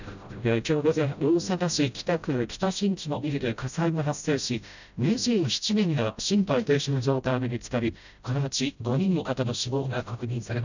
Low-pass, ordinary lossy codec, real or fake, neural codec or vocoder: 7.2 kHz; none; fake; codec, 16 kHz, 0.5 kbps, FreqCodec, smaller model